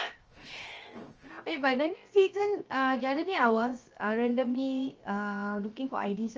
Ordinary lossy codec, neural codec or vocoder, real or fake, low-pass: Opus, 24 kbps; codec, 16 kHz, 0.7 kbps, FocalCodec; fake; 7.2 kHz